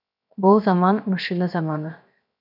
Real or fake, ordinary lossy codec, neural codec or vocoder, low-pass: fake; none; codec, 16 kHz, 0.7 kbps, FocalCodec; 5.4 kHz